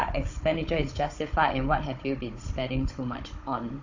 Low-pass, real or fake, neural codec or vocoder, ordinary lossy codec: 7.2 kHz; fake; codec, 16 kHz, 16 kbps, FunCodec, trained on Chinese and English, 50 frames a second; AAC, 48 kbps